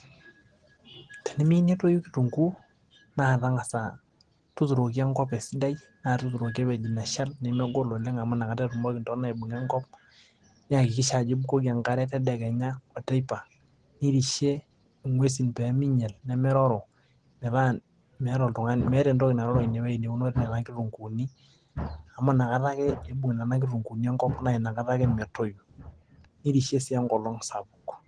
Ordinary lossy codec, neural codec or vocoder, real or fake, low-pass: Opus, 16 kbps; none; real; 9.9 kHz